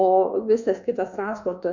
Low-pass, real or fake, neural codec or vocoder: 7.2 kHz; fake; codec, 24 kHz, 1.2 kbps, DualCodec